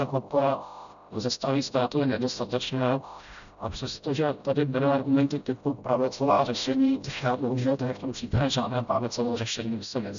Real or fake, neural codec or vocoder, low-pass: fake; codec, 16 kHz, 0.5 kbps, FreqCodec, smaller model; 7.2 kHz